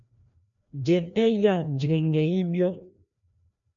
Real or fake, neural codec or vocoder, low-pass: fake; codec, 16 kHz, 1 kbps, FreqCodec, larger model; 7.2 kHz